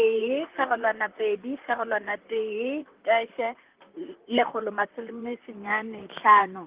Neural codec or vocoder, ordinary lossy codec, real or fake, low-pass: vocoder, 44.1 kHz, 128 mel bands, Pupu-Vocoder; Opus, 32 kbps; fake; 3.6 kHz